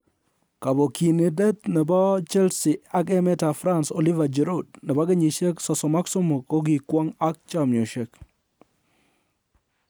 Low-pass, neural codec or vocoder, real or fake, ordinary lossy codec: none; none; real; none